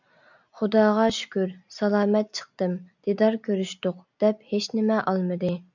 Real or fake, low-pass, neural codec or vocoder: real; 7.2 kHz; none